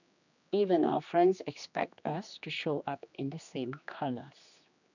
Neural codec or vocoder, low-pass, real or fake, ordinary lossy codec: codec, 16 kHz, 2 kbps, X-Codec, HuBERT features, trained on general audio; 7.2 kHz; fake; none